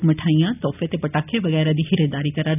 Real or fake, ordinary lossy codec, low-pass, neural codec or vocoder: real; none; 3.6 kHz; none